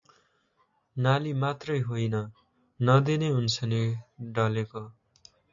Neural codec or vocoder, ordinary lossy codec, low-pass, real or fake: none; AAC, 64 kbps; 7.2 kHz; real